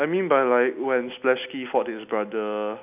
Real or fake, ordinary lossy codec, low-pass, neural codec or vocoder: real; none; 3.6 kHz; none